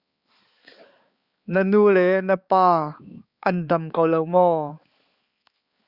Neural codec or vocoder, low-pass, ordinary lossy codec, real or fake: codec, 16 kHz, 4 kbps, X-Codec, HuBERT features, trained on balanced general audio; 5.4 kHz; Opus, 64 kbps; fake